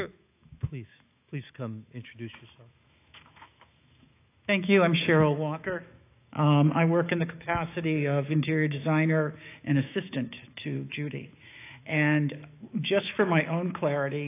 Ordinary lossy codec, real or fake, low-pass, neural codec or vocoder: AAC, 24 kbps; fake; 3.6 kHz; autoencoder, 48 kHz, 128 numbers a frame, DAC-VAE, trained on Japanese speech